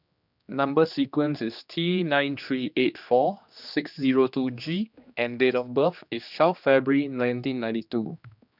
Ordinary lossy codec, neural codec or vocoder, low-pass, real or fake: none; codec, 16 kHz, 2 kbps, X-Codec, HuBERT features, trained on general audio; 5.4 kHz; fake